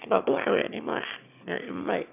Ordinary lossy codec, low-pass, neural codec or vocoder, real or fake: none; 3.6 kHz; autoencoder, 22.05 kHz, a latent of 192 numbers a frame, VITS, trained on one speaker; fake